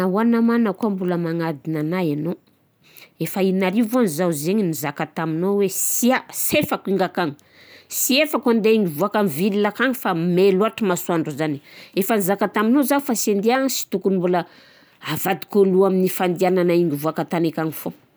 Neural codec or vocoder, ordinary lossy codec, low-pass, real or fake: none; none; none; real